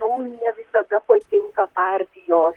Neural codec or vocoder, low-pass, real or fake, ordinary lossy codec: vocoder, 44.1 kHz, 128 mel bands, Pupu-Vocoder; 19.8 kHz; fake; Opus, 24 kbps